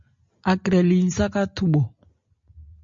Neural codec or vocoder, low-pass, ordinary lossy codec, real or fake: none; 7.2 kHz; MP3, 48 kbps; real